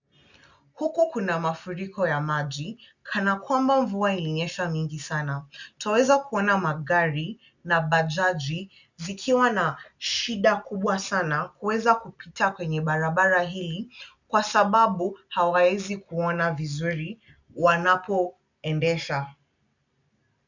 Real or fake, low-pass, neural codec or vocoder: real; 7.2 kHz; none